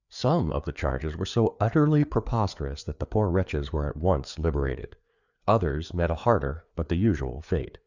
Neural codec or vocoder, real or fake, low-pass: codec, 16 kHz, 4 kbps, FreqCodec, larger model; fake; 7.2 kHz